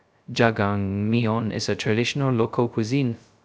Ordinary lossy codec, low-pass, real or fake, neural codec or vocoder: none; none; fake; codec, 16 kHz, 0.2 kbps, FocalCodec